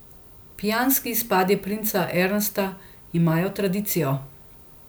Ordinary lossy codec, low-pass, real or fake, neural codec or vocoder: none; none; real; none